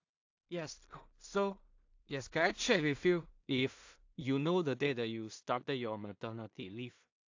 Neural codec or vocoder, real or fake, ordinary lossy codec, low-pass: codec, 16 kHz in and 24 kHz out, 0.4 kbps, LongCat-Audio-Codec, two codebook decoder; fake; AAC, 48 kbps; 7.2 kHz